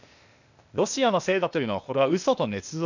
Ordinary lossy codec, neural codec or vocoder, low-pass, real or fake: none; codec, 16 kHz, 0.8 kbps, ZipCodec; 7.2 kHz; fake